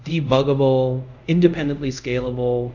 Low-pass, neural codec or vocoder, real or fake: 7.2 kHz; codec, 24 kHz, 0.5 kbps, DualCodec; fake